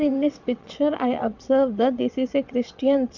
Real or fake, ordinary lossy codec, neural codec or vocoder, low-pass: fake; MP3, 64 kbps; vocoder, 22.05 kHz, 80 mel bands, WaveNeXt; 7.2 kHz